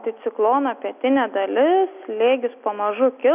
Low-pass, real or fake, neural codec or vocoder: 3.6 kHz; real; none